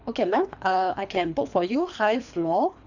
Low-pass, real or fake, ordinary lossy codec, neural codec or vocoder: 7.2 kHz; fake; none; codec, 24 kHz, 3 kbps, HILCodec